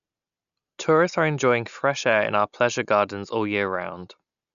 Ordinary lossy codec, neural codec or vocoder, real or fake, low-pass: none; none; real; 7.2 kHz